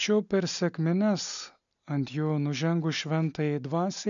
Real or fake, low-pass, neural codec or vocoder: real; 7.2 kHz; none